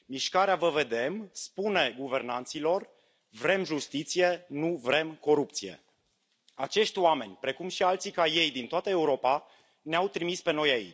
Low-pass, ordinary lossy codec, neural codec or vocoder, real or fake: none; none; none; real